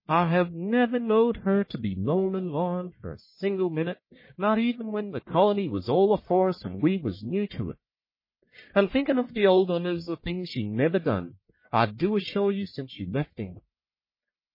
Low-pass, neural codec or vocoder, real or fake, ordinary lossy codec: 5.4 kHz; codec, 44.1 kHz, 1.7 kbps, Pupu-Codec; fake; MP3, 24 kbps